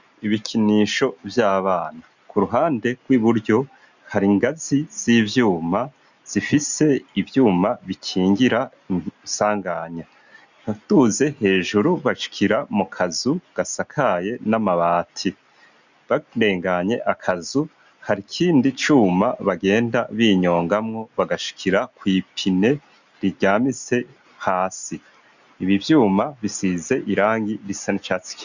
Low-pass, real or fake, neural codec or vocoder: 7.2 kHz; real; none